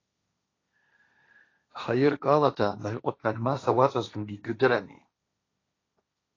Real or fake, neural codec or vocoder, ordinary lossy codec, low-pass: fake; codec, 16 kHz, 1.1 kbps, Voila-Tokenizer; AAC, 32 kbps; 7.2 kHz